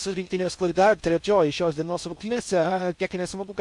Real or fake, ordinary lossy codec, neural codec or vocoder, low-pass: fake; MP3, 64 kbps; codec, 16 kHz in and 24 kHz out, 0.6 kbps, FocalCodec, streaming, 4096 codes; 10.8 kHz